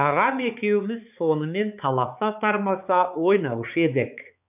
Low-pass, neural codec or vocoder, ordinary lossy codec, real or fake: 3.6 kHz; codec, 16 kHz, 4 kbps, X-Codec, HuBERT features, trained on balanced general audio; none; fake